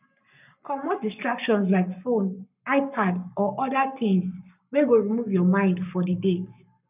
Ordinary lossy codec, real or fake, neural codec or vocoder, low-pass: none; fake; codec, 44.1 kHz, 7.8 kbps, Pupu-Codec; 3.6 kHz